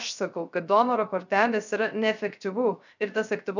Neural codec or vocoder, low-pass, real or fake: codec, 16 kHz, 0.3 kbps, FocalCodec; 7.2 kHz; fake